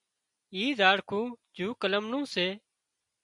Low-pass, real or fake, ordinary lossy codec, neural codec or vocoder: 10.8 kHz; real; MP3, 96 kbps; none